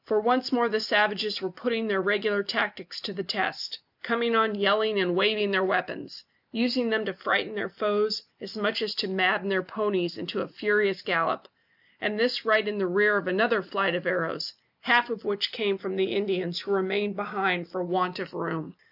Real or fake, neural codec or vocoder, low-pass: real; none; 5.4 kHz